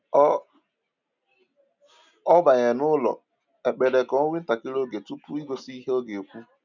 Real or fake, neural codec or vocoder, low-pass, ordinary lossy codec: real; none; 7.2 kHz; none